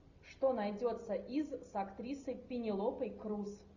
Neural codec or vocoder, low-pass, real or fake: none; 7.2 kHz; real